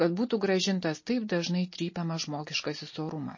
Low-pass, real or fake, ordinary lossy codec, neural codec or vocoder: 7.2 kHz; real; MP3, 32 kbps; none